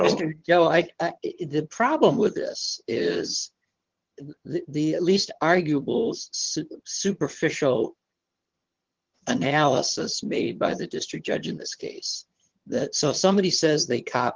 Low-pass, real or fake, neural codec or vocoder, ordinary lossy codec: 7.2 kHz; fake; vocoder, 22.05 kHz, 80 mel bands, HiFi-GAN; Opus, 16 kbps